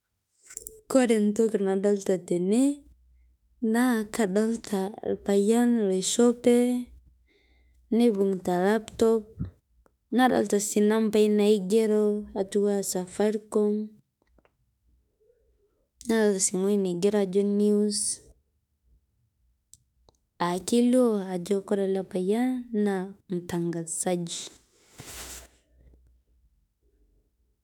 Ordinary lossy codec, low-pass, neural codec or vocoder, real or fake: none; 19.8 kHz; autoencoder, 48 kHz, 32 numbers a frame, DAC-VAE, trained on Japanese speech; fake